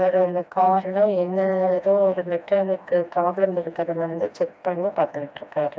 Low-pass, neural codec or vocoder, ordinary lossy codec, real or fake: none; codec, 16 kHz, 1 kbps, FreqCodec, smaller model; none; fake